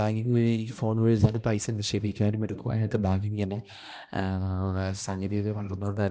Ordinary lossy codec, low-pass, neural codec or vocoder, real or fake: none; none; codec, 16 kHz, 1 kbps, X-Codec, HuBERT features, trained on balanced general audio; fake